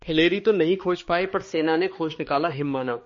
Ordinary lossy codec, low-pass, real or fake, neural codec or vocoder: MP3, 32 kbps; 7.2 kHz; fake; codec, 16 kHz, 2 kbps, X-Codec, HuBERT features, trained on balanced general audio